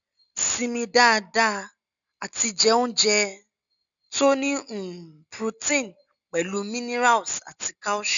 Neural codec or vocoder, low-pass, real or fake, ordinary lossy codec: none; 7.2 kHz; real; none